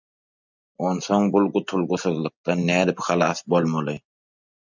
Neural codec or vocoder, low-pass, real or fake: none; 7.2 kHz; real